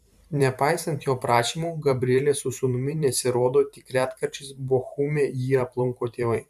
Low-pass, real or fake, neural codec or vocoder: 14.4 kHz; fake; vocoder, 44.1 kHz, 128 mel bands every 512 samples, BigVGAN v2